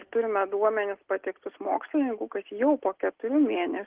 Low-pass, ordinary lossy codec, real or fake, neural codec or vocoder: 3.6 kHz; Opus, 16 kbps; real; none